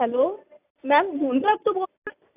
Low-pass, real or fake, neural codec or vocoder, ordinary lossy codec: 3.6 kHz; real; none; AAC, 32 kbps